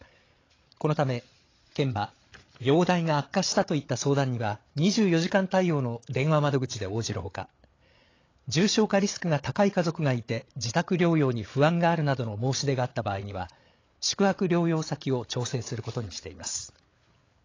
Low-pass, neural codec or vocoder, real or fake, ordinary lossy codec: 7.2 kHz; codec, 16 kHz, 8 kbps, FreqCodec, larger model; fake; AAC, 32 kbps